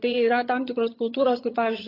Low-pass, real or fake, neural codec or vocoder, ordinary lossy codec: 5.4 kHz; fake; vocoder, 22.05 kHz, 80 mel bands, HiFi-GAN; AAC, 24 kbps